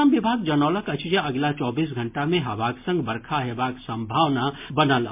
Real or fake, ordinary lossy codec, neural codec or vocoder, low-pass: real; MP3, 32 kbps; none; 3.6 kHz